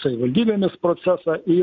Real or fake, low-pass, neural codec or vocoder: real; 7.2 kHz; none